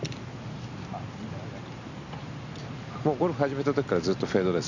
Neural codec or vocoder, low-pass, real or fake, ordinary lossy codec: none; 7.2 kHz; real; none